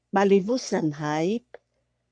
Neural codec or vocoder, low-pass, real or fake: codec, 44.1 kHz, 3.4 kbps, Pupu-Codec; 9.9 kHz; fake